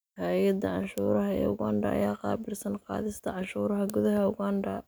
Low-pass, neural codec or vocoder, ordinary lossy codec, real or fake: none; none; none; real